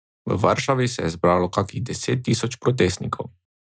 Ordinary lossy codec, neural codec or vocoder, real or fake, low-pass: none; none; real; none